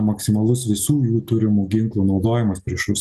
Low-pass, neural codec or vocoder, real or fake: 14.4 kHz; none; real